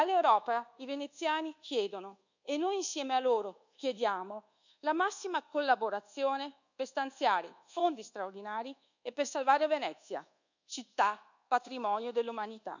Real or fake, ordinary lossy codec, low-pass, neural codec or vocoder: fake; none; 7.2 kHz; codec, 24 kHz, 1.2 kbps, DualCodec